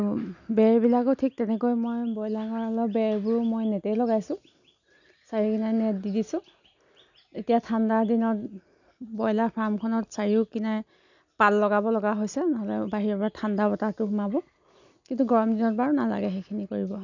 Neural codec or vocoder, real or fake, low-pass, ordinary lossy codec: none; real; 7.2 kHz; none